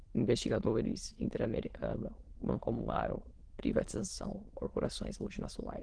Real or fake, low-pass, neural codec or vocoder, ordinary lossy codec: fake; 9.9 kHz; autoencoder, 22.05 kHz, a latent of 192 numbers a frame, VITS, trained on many speakers; Opus, 16 kbps